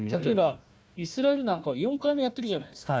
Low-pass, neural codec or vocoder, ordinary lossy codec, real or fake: none; codec, 16 kHz, 1 kbps, FunCodec, trained on Chinese and English, 50 frames a second; none; fake